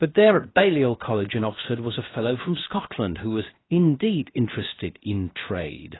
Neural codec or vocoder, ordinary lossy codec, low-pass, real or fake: codec, 16 kHz, 0.7 kbps, FocalCodec; AAC, 16 kbps; 7.2 kHz; fake